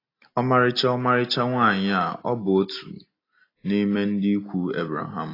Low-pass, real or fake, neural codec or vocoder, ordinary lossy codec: 5.4 kHz; real; none; AAC, 24 kbps